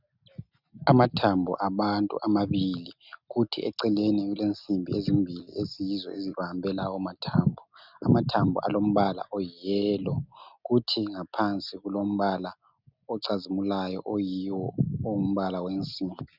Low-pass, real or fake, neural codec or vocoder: 5.4 kHz; real; none